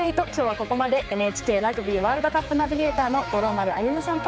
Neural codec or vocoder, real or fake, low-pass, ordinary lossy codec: codec, 16 kHz, 4 kbps, X-Codec, HuBERT features, trained on general audio; fake; none; none